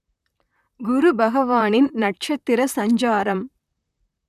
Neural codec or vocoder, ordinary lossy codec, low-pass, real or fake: vocoder, 44.1 kHz, 128 mel bands, Pupu-Vocoder; none; 14.4 kHz; fake